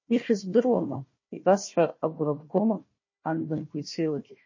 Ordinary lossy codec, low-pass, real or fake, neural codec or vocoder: MP3, 32 kbps; 7.2 kHz; fake; codec, 16 kHz, 1 kbps, FunCodec, trained on Chinese and English, 50 frames a second